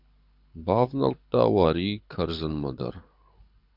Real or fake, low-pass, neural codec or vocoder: fake; 5.4 kHz; codec, 24 kHz, 6 kbps, HILCodec